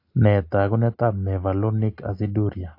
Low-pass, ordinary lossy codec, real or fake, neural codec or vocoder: 5.4 kHz; AAC, 32 kbps; real; none